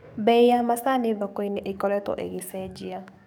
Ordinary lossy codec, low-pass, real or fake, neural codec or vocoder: none; 19.8 kHz; fake; codec, 44.1 kHz, 7.8 kbps, DAC